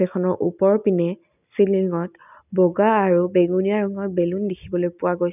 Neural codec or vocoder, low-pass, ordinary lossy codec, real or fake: none; 3.6 kHz; none; real